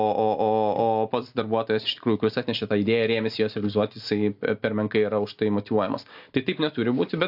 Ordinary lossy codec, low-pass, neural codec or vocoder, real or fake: AAC, 48 kbps; 5.4 kHz; none; real